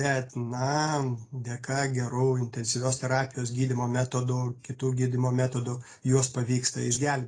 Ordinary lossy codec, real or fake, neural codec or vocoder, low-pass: AAC, 32 kbps; real; none; 9.9 kHz